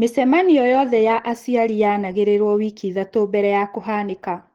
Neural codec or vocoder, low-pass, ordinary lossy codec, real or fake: none; 19.8 kHz; Opus, 16 kbps; real